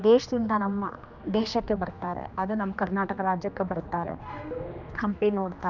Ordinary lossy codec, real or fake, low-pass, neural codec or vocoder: none; fake; 7.2 kHz; codec, 16 kHz, 2 kbps, X-Codec, HuBERT features, trained on general audio